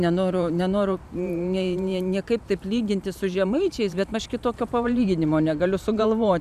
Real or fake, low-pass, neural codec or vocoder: fake; 14.4 kHz; vocoder, 44.1 kHz, 128 mel bands every 512 samples, BigVGAN v2